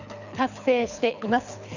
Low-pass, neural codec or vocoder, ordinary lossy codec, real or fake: 7.2 kHz; codec, 24 kHz, 6 kbps, HILCodec; none; fake